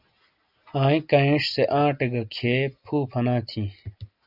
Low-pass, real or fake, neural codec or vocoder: 5.4 kHz; real; none